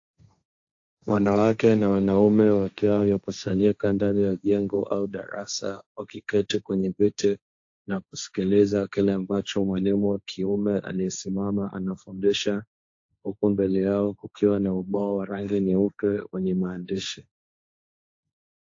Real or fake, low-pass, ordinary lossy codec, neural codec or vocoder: fake; 7.2 kHz; AAC, 48 kbps; codec, 16 kHz, 1.1 kbps, Voila-Tokenizer